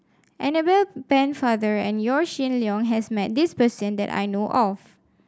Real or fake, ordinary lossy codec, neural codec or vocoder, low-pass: real; none; none; none